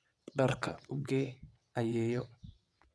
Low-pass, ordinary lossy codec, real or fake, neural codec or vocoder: none; none; fake; vocoder, 22.05 kHz, 80 mel bands, WaveNeXt